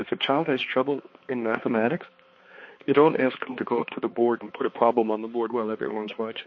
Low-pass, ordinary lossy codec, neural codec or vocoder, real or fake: 7.2 kHz; MP3, 32 kbps; codec, 16 kHz, 2 kbps, X-Codec, HuBERT features, trained on balanced general audio; fake